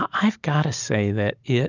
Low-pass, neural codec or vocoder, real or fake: 7.2 kHz; none; real